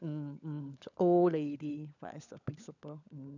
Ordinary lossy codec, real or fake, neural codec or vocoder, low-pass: none; fake; codec, 16 kHz, 16 kbps, FunCodec, trained on LibriTTS, 50 frames a second; 7.2 kHz